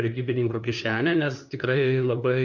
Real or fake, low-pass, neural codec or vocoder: fake; 7.2 kHz; codec, 16 kHz, 2 kbps, FunCodec, trained on LibriTTS, 25 frames a second